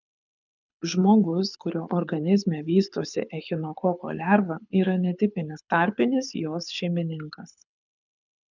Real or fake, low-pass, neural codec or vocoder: fake; 7.2 kHz; codec, 24 kHz, 6 kbps, HILCodec